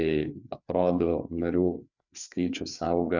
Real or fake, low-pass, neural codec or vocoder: fake; 7.2 kHz; codec, 16 kHz, 4 kbps, FreqCodec, larger model